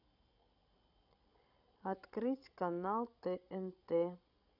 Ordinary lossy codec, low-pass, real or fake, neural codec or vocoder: none; 5.4 kHz; fake; codec, 16 kHz, 16 kbps, FreqCodec, larger model